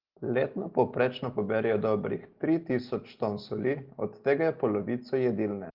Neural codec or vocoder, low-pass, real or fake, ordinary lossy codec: none; 5.4 kHz; real; Opus, 16 kbps